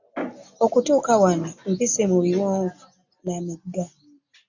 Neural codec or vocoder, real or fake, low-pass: none; real; 7.2 kHz